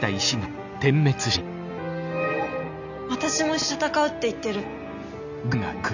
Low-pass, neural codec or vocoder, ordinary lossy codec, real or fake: 7.2 kHz; none; none; real